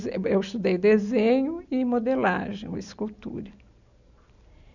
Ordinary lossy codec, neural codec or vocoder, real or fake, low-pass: none; none; real; 7.2 kHz